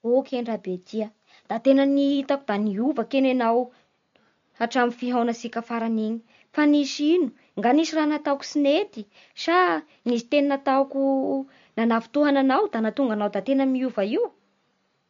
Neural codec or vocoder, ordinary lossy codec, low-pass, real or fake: none; MP3, 48 kbps; 7.2 kHz; real